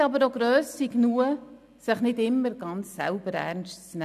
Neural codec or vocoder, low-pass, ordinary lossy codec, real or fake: none; 14.4 kHz; none; real